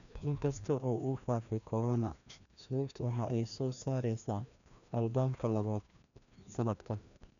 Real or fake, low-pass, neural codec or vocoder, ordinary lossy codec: fake; 7.2 kHz; codec, 16 kHz, 1 kbps, FreqCodec, larger model; none